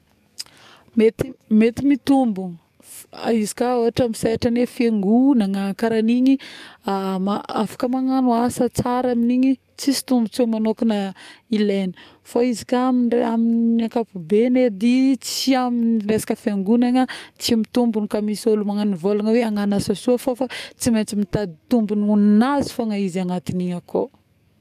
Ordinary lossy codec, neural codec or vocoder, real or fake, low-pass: none; codec, 44.1 kHz, 7.8 kbps, DAC; fake; 14.4 kHz